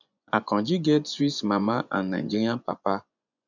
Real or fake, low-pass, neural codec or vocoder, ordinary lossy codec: fake; 7.2 kHz; vocoder, 24 kHz, 100 mel bands, Vocos; none